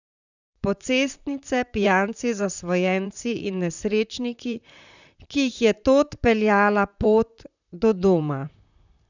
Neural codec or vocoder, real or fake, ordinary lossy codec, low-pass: vocoder, 44.1 kHz, 128 mel bands, Pupu-Vocoder; fake; none; 7.2 kHz